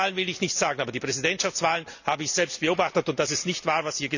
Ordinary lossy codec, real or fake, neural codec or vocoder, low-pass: none; real; none; 7.2 kHz